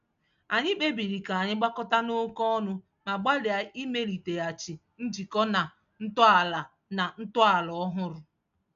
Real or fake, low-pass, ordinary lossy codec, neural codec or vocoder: real; 7.2 kHz; AAC, 64 kbps; none